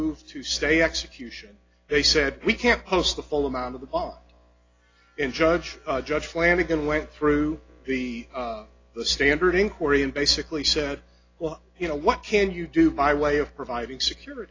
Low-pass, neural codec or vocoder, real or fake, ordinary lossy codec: 7.2 kHz; none; real; AAC, 32 kbps